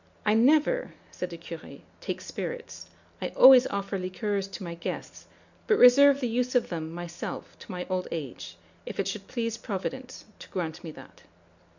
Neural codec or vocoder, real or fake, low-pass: none; real; 7.2 kHz